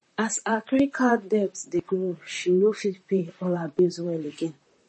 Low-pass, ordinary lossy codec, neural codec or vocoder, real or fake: 10.8 kHz; MP3, 32 kbps; vocoder, 44.1 kHz, 128 mel bands, Pupu-Vocoder; fake